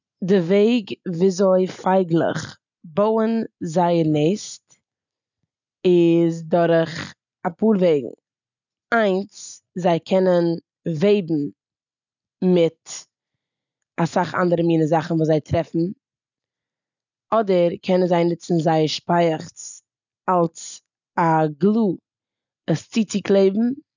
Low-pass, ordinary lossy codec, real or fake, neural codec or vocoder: 7.2 kHz; none; real; none